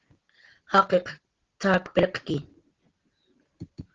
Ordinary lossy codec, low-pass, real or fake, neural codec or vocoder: Opus, 16 kbps; 7.2 kHz; real; none